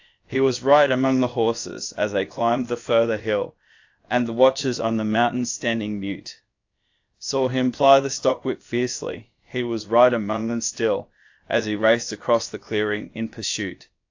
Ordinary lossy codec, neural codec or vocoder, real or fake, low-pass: AAC, 48 kbps; codec, 16 kHz, about 1 kbps, DyCAST, with the encoder's durations; fake; 7.2 kHz